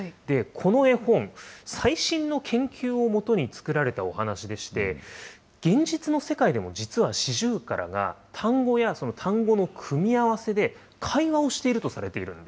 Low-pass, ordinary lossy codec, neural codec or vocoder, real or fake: none; none; none; real